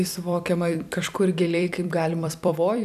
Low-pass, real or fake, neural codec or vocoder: 14.4 kHz; fake; vocoder, 44.1 kHz, 128 mel bands every 256 samples, BigVGAN v2